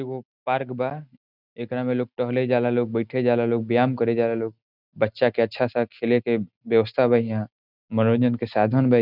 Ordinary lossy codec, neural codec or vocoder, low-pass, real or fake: none; none; 5.4 kHz; real